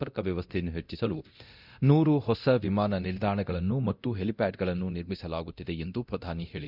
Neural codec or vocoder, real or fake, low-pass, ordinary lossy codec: codec, 24 kHz, 0.9 kbps, DualCodec; fake; 5.4 kHz; none